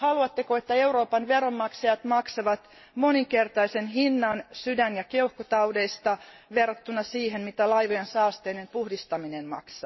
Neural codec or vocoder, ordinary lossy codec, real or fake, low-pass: none; MP3, 24 kbps; real; 7.2 kHz